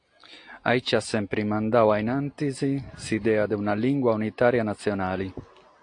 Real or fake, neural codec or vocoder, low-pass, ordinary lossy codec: real; none; 9.9 kHz; AAC, 48 kbps